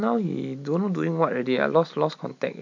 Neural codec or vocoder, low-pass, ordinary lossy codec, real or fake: none; 7.2 kHz; MP3, 48 kbps; real